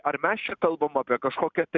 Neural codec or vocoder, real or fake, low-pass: none; real; 7.2 kHz